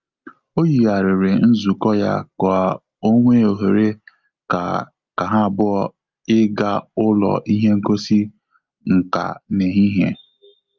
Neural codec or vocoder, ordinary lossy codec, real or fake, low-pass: none; Opus, 24 kbps; real; 7.2 kHz